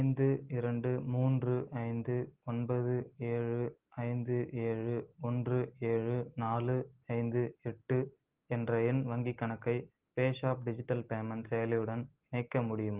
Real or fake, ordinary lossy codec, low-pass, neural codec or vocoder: real; Opus, 16 kbps; 3.6 kHz; none